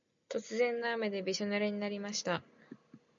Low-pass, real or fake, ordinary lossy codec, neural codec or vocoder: 7.2 kHz; real; MP3, 64 kbps; none